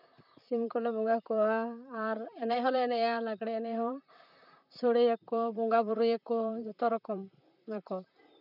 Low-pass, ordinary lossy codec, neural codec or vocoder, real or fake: 5.4 kHz; none; vocoder, 44.1 kHz, 128 mel bands, Pupu-Vocoder; fake